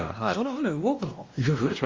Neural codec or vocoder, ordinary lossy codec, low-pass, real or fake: codec, 16 kHz, 1 kbps, X-Codec, WavLM features, trained on Multilingual LibriSpeech; Opus, 32 kbps; 7.2 kHz; fake